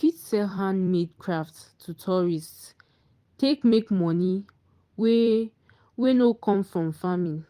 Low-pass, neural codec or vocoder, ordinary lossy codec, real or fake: 14.4 kHz; vocoder, 44.1 kHz, 128 mel bands every 256 samples, BigVGAN v2; Opus, 24 kbps; fake